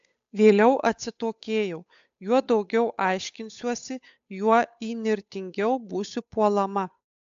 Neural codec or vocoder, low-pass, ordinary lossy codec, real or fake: codec, 16 kHz, 8 kbps, FunCodec, trained on Chinese and English, 25 frames a second; 7.2 kHz; AAC, 64 kbps; fake